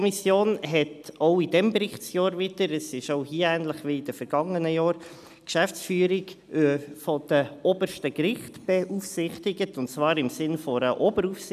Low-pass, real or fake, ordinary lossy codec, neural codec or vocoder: 14.4 kHz; real; none; none